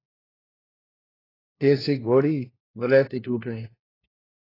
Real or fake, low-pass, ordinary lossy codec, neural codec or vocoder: fake; 5.4 kHz; AAC, 24 kbps; codec, 16 kHz, 1 kbps, FunCodec, trained on LibriTTS, 50 frames a second